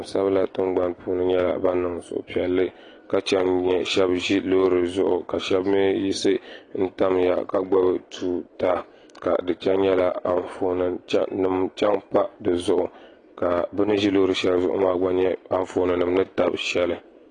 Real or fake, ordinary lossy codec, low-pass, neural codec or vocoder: real; AAC, 32 kbps; 9.9 kHz; none